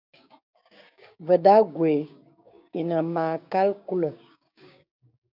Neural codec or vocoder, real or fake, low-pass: codec, 16 kHz, 6 kbps, DAC; fake; 5.4 kHz